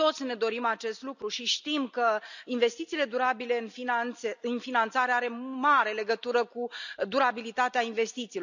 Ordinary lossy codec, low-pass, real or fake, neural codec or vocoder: none; 7.2 kHz; real; none